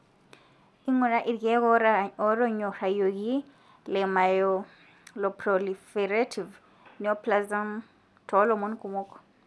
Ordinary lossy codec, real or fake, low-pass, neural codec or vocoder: none; real; none; none